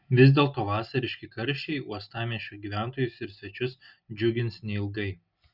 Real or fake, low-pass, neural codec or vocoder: real; 5.4 kHz; none